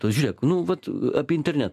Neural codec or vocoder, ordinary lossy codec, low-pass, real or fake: none; AAC, 96 kbps; 14.4 kHz; real